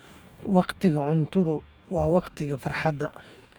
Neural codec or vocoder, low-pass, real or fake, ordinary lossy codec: codec, 44.1 kHz, 2.6 kbps, DAC; 19.8 kHz; fake; none